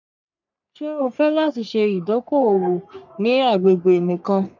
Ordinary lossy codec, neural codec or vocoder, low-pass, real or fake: none; codec, 44.1 kHz, 3.4 kbps, Pupu-Codec; 7.2 kHz; fake